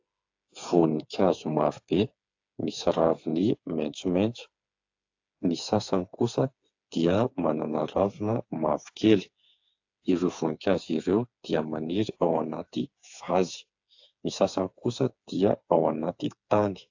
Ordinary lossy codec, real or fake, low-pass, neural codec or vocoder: AAC, 48 kbps; fake; 7.2 kHz; codec, 16 kHz, 4 kbps, FreqCodec, smaller model